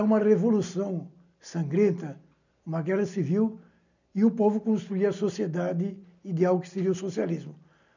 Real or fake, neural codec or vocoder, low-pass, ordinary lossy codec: real; none; 7.2 kHz; none